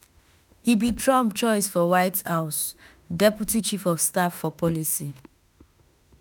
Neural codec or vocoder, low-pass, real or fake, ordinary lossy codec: autoencoder, 48 kHz, 32 numbers a frame, DAC-VAE, trained on Japanese speech; none; fake; none